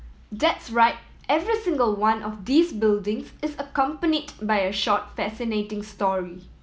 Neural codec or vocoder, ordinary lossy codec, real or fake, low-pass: none; none; real; none